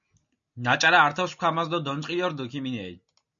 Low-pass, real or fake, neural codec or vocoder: 7.2 kHz; real; none